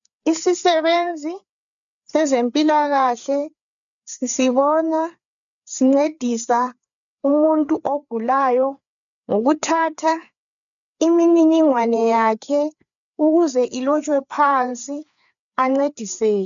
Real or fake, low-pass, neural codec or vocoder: fake; 7.2 kHz; codec, 16 kHz, 4 kbps, FreqCodec, larger model